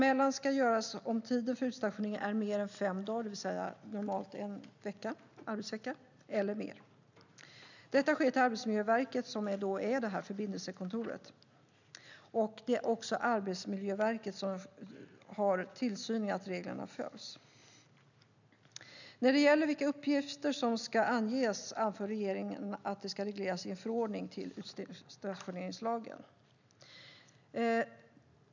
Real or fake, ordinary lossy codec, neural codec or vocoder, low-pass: real; none; none; 7.2 kHz